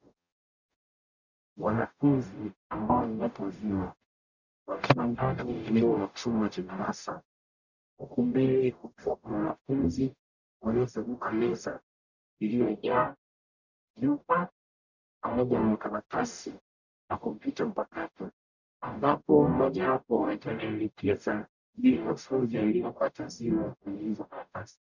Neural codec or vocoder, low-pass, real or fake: codec, 44.1 kHz, 0.9 kbps, DAC; 7.2 kHz; fake